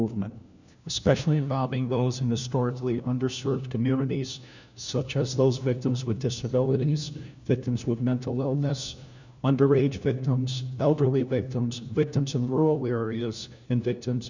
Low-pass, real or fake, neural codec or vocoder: 7.2 kHz; fake; codec, 16 kHz, 1 kbps, FunCodec, trained on LibriTTS, 50 frames a second